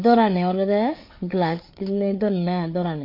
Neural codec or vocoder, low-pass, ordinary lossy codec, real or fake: codec, 16 kHz, 4 kbps, FreqCodec, larger model; 5.4 kHz; MP3, 32 kbps; fake